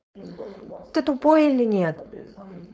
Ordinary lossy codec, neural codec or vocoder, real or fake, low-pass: none; codec, 16 kHz, 4.8 kbps, FACodec; fake; none